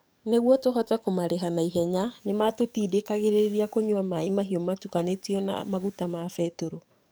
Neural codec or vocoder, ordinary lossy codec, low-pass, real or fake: codec, 44.1 kHz, 7.8 kbps, DAC; none; none; fake